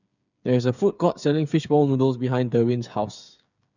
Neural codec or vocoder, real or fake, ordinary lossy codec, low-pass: codec, 16 kHz, 8 kbps, FreqCodec, smaller model; fake; none; 7.2 kHz